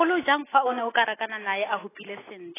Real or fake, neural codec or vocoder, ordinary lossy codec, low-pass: real; none; AAC, 16 kbps; 3.6 kHz